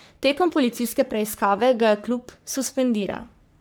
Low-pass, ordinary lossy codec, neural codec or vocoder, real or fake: none; none; codec, 44.1 kHz, 3.4 kbps, Pupu-Codec; fake